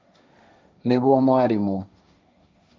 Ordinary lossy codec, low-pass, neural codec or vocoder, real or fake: none; none; codec, 16 kHz, 1.1 kbps, Voila-Tokenizer; fake